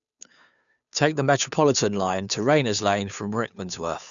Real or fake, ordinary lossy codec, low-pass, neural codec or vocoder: fake; none; 7.2 kHz; codec, 16 kHz, 2 kbps, FunCodec, trained on Chinese and English, 25 frames a second